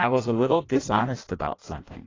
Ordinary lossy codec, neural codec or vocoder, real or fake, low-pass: AAC, 32 kbps; codec, 16 kHz in and 24 kHz out, 0.6 kbps, FireRedTTS-2 codec; fake; 7.2 kHz